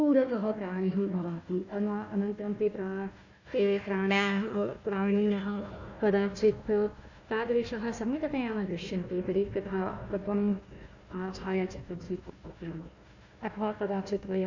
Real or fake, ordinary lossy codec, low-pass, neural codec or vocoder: fake; none; 7.2 kHz; codec, 16 kHz, 1 kbps, FunCodec, trained on Chinese and English, 50 frames a second